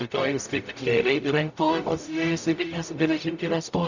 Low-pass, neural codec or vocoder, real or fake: 7.2 kHz; codec, 44.1 kHz, 0.9 kbps, DAC; fake